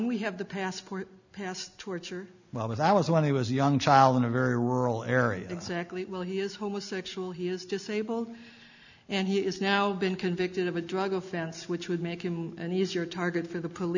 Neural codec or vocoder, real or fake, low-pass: none; real; 7.2 kHz